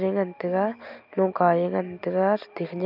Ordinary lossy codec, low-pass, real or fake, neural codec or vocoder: none; 5.4 kHz; real; none